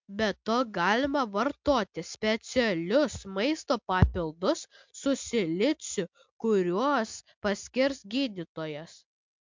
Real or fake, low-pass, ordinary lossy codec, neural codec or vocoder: real; 7.2 kHz; MP3, 64 kbps; none